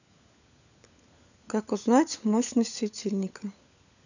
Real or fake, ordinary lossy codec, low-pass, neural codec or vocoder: fake; none; 7.2 kHz; codec, 16 kHz, 16 kbps, FunCodec, trained on LibriTTS, 50 frames a second